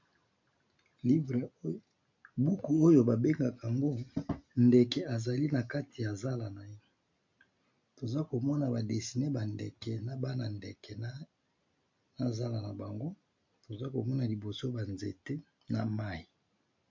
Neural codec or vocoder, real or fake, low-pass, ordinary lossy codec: none; real; 7.2 kHz; MP3, 48 kbps